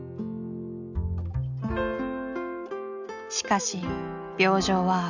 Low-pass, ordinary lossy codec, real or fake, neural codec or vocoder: 7.2 kHz; none; real; none